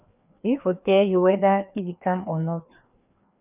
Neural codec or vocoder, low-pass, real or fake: codec, 16 kHz, 2 kbps, FreqCodec, larger model; 3.6 kHz; fake